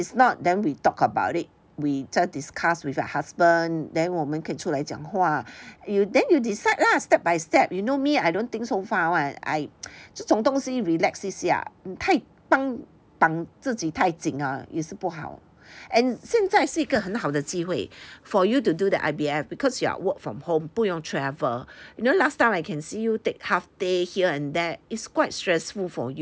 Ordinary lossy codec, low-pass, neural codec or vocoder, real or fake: none; none; none; real